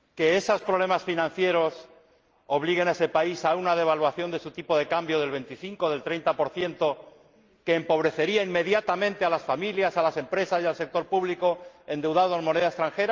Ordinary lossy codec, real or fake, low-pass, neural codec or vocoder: Opus, 24 kbps; real; 7.2 kHz; none